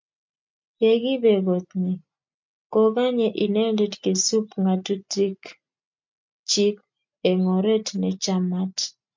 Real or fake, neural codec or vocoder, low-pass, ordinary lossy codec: real; none; 7.2 kHz; MP3, 64 kbps